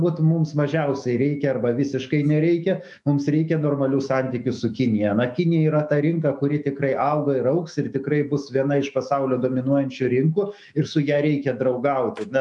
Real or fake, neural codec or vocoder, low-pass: fake; autoencoder, 48 kHz, 128 numbers a frame, DAC-VAE, trained on Japanese speech; 10.8 kHz